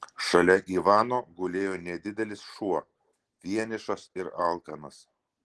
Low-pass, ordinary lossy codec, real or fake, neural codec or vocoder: 10.8 kHz; Opus, 16 kbps; real; none